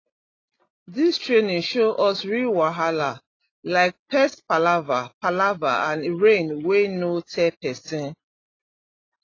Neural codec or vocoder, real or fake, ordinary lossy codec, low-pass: none; real; AAC, 32 kbps; 7.2 kHz